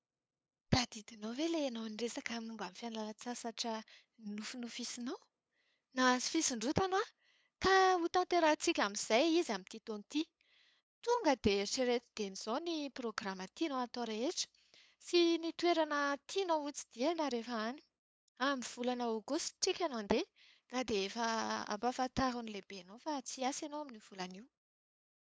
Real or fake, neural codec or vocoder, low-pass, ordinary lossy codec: fake; codec, 16 kHz, 8 kbps, FunCodec, trained on LibriTTS, 25 frames a second; none; none